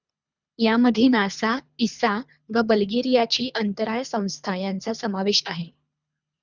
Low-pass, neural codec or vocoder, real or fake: 7.2 kHz; codec, 24 kHz, 3 kbps, HILCodec; fake